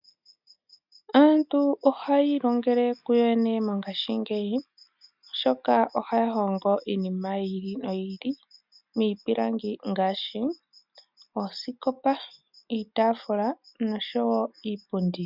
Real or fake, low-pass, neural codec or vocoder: real; 5.4 kHz; none